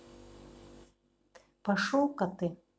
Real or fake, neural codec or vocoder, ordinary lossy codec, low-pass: real; none; none; none